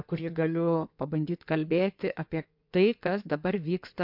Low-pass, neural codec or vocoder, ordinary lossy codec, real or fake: 5.4 kHz; codec, 16 kHz in and 24 kHz out, 2.2 kbps, FireRedTTS-2 codec; MP3, 48 kbps; fake